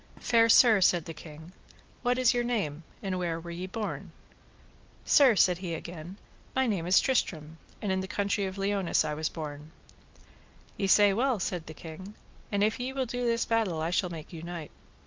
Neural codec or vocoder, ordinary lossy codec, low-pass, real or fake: none; Opus, 24 kbps; 7.2 kHz; real